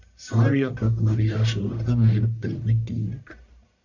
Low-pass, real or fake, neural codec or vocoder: 7.2 kHz; fake; codec, 44.1 kHz, 1.7 kbps, Pupu-Codec